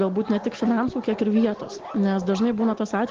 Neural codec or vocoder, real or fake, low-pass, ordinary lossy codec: none; real; 7.2 kHz; Opus, 16 kbps